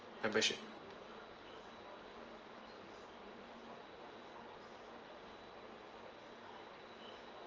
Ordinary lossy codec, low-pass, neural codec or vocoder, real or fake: Opus, 24 kbps; 7.2 kHz; none; real